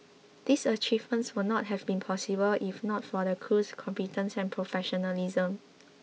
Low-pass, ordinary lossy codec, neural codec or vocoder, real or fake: none; none; none; real